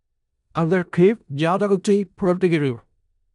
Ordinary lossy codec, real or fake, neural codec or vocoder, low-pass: none; fake; codec, 16 kHz in and 24 kHz out, 0.4 kbps, LongCat-Audio-Codec, four codebook decoder; 10.8 kHz